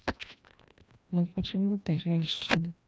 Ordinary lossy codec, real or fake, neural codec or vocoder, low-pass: none; fake; codec, 16 kHz, 1 kbps, FreqCodec, larger model; none